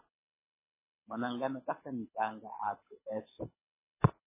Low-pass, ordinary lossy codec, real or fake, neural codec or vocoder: 3.6 kHz; MP3, 16 kbps; fake; codec, 24 kHz, 6 kbps, HILCodec